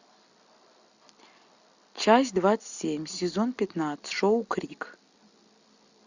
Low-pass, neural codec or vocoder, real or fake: 7.2 kHz; none; real